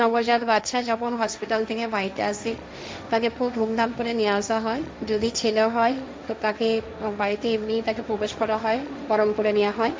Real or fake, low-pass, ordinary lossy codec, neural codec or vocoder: fake; none; none; codec, 16 kHz, 1.1 kbps, Voila-Tokenizer